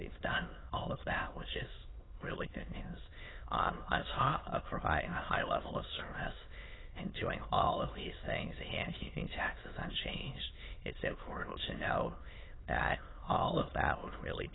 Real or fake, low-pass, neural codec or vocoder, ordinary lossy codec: fake; 7.2 kHz; autoencoder, 22.05 kHz, a latent of 192 numbers a frame, VITS, trained on many speakers; AAC, 16 kbps